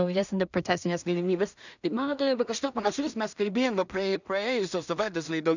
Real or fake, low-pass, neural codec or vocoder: fake; 7.2 kHz; codec, 16 kHz in and 24 kHz out, 0.4 kbps, LongCat-Audio-Codec, two codebook decoder